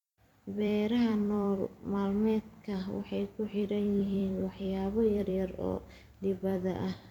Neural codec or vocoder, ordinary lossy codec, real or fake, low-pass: vocoder, 44.1 kHz, 128 mel bands every 256 samples, BigVGAN v2; MP3, 96 kbps; fake; 19.8 kHz